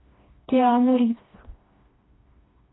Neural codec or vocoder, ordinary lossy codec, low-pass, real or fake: codec, 16 kHz, 2 kbps, FreqCodec, smaller model; AAC, 16 kbps; 7.2 kHz; fake